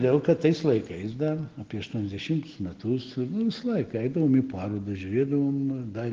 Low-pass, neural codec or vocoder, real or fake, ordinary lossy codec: 7.2 kHz; none; real; Opus, 16 kbps